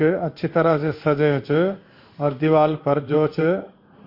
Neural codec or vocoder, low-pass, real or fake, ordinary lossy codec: codec, 16 kHz in and 24 kHz out, 1 kbps, XY-Tokenizer; 5.4 kHz; fake; MP3, 32 kbps